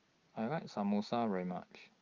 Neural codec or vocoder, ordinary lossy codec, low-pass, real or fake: none; Opus, 24 kbps; 7.2 kHz; real